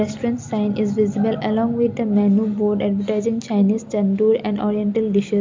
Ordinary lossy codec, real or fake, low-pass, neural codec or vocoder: MP3, 64 kbps; real; 7.2 kHz; none